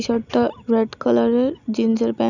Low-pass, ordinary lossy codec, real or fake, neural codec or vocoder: 7.2 kHz; none; real; none